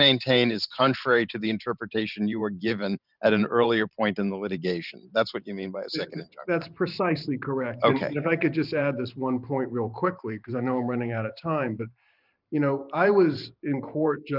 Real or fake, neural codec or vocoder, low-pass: fake; vocoder, 44.1 kHz, 128 mel bands every 512 samples, BigVGAN v2; 5.4 kHz